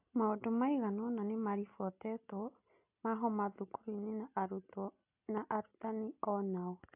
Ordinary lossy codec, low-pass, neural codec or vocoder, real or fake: AAC, 32 kbps; 3.6 kHz; none; real